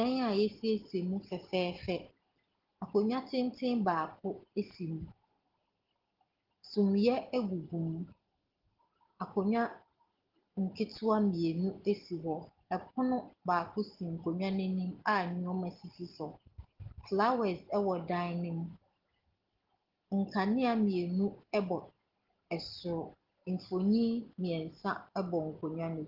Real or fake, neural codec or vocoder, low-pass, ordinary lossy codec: real; none; 5.4 kHz; Opus, 16 kbps